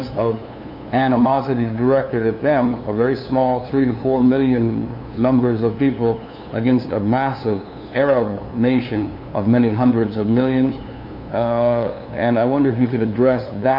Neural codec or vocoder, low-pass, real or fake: codec, 16 kHz, 2 kbps, FunCodec, trained on LibriTTS, 25 frames a second; 5.4 kHz; fake